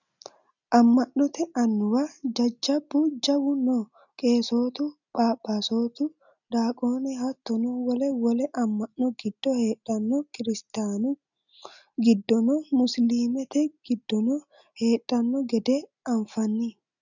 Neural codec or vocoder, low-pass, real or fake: none; 7.2 kHz; real